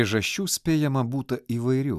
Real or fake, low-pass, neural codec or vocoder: real; 14.4 kHz; none